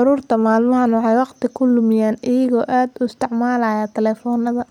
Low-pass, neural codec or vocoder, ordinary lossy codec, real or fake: 19.8 kHz; none; none; real